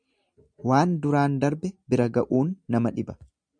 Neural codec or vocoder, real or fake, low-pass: none; real; 9.9 kHz